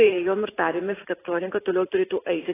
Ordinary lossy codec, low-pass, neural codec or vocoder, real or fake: AAC, 16 kbps; 3.6 kHz; vocoder, 44.1 kHz, 128 mel bands every 512 samples, BigVGAN v2; fake